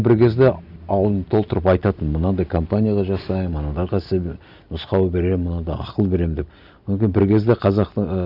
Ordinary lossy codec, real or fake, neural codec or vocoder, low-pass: none; real; none; 5.4 kHz